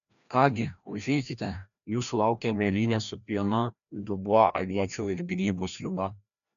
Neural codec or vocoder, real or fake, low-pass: codec, 16 kHz, 1 kbps, FreqCodec, larger model; fake; 7.2 kHz